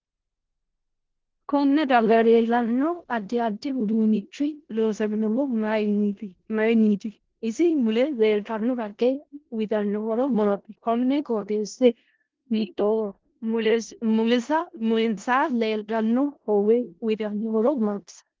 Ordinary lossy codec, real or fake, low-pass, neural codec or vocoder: Opus, 16 kbps; fake; 7.2 kHz; codec, 16 kHz in and 24 kHz out, 0.4 kbps, LongCat-Audio-Codec, four codebook decoder